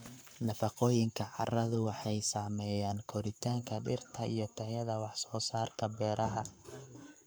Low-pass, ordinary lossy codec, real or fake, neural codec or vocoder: none; none; fake; codec, 44.1 kHz, 7.8 kbps, Pupu-Codec